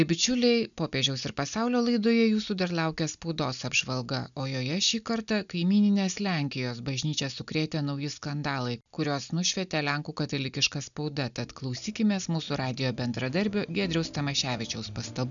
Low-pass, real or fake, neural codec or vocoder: 7.2 kHz; real; none